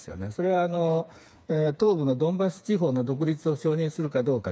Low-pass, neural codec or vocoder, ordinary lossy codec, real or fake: none; codec, 16 kHz, 4 kbps, FreqCodec, smaller model; none; fake